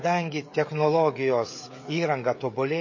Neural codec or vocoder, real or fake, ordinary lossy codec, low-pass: codec, 16 kHz, 16 kbps, FreqCodec, smaller model; fake; MP3, 32 kbps; 7.2 kHz